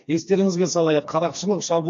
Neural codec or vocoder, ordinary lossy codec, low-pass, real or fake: codec, 16 kHz, 2 kbps, FreqCodec, smaller model; MP3, 48 kbps; 7.2 kHz; fake